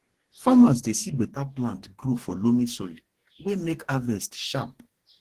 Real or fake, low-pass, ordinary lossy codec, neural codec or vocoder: fake; 14.4 kHz; Opus, 16 kbps; codec, 44.1 kHz, 2.6 kbps, DAC